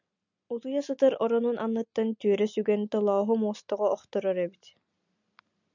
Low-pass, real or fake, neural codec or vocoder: 7.2 kHz; real; none